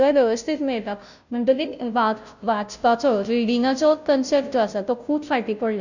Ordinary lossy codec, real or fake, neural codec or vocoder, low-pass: none; fake; codec, 16 kHz, 0.5 kbps, FunCodec, trained on Chinese and English, 25 frames a second; 7.2 kHz